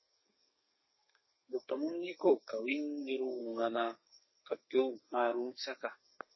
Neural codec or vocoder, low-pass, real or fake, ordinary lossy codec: codec, 32 kHz, 1.9 kbps, SNAC; 7.2 kHz; fake; MP3, 24 kbps